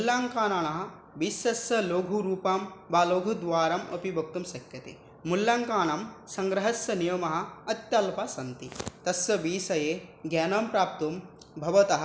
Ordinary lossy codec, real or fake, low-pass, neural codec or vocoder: none; real; none; none